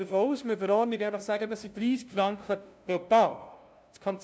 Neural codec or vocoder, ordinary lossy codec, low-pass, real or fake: codec, 16 kHz, 0.5 kbps, FunCodec, trained on LibriTTS, 25 frames a second; none; none; fake